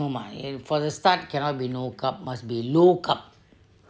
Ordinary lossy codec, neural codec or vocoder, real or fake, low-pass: none; none; real; none